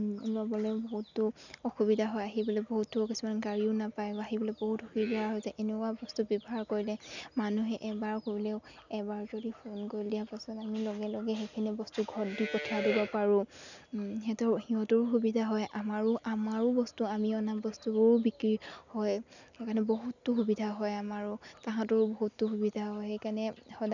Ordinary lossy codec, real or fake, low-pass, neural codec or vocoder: none; real; 7.2 kHz; none